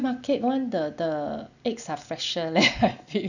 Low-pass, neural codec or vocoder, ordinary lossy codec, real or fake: 7.2 kHz; none; none; real